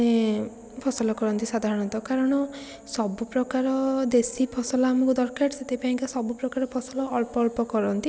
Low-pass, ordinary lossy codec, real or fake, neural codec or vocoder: none; none; real; none